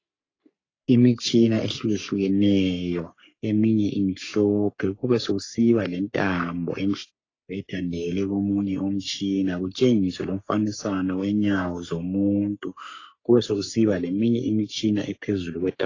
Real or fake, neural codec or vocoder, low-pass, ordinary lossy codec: fake; codec, 44.1 kHz, 3.4 kbps, Pupu-Codec; 7.2 kHz; AAC, 32 kbps